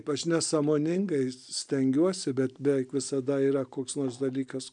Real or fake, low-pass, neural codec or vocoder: real; 9.9 kHz; none